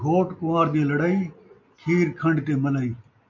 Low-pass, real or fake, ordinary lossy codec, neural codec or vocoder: 7.2 kHz; real; MP3, 64 kbps; none